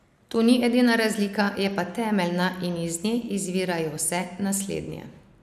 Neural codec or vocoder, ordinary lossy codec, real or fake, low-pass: none; none; real; 14.4 kHz